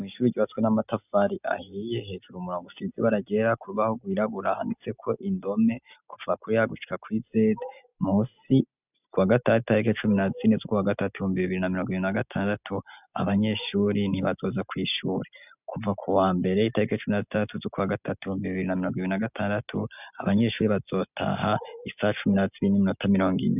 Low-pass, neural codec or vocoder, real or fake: 3.6 kHz; none; real